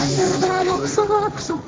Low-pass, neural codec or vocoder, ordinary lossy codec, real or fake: none; codec, 16 kHz, 1.1 kbps, Voila-Tokenizer; none; fake